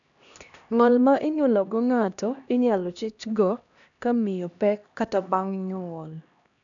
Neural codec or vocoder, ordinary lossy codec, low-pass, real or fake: codec, 16 kHz, 1 kbps, X-Codec, HuBERT features, trained on LibriSpeech; none; 7.2 kHz; fake